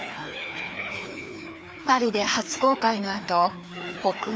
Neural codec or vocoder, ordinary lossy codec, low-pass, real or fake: codec, 16 kHz, 2 kbps, FreqCodec, larger model; none; none; fake